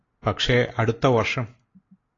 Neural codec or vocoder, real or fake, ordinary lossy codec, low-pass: none; real; AAC, 32 kbps; 7.2 kHz